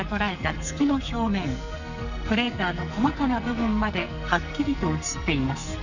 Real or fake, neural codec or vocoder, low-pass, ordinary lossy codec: fake; codec, 44.1 kHz, 2.6 kbps, SNAC; 7.2 kHz; none